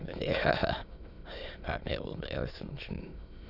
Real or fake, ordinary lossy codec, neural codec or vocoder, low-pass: fake; none; autoencoder, 22.05 kHz, a latent of 192 numbers a frame, VITS, trained on many speakers; 5.4 kHz